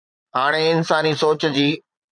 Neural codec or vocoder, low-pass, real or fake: vocoder, 22.05 kHz, 80 mel bands, Vocos; 9.9 kHz; fake